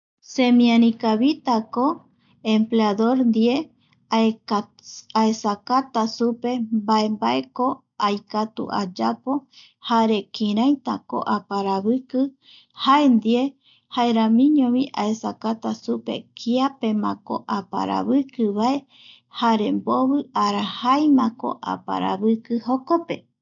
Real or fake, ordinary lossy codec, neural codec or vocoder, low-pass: real; none; none; 7.2 kHz